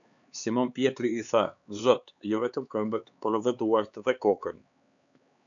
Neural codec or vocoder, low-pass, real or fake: codec, 16 kHz, 4 kbps, X-Codec, HuBERT features, trained on LibriSpeech; 7.2 kHz; fake